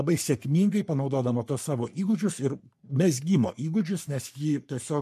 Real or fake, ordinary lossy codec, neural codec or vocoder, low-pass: fake; MP3, 64 kbps; codec, 44.1 kHz, 3.4 kbps, Pupu-Codec; 14.4 kHz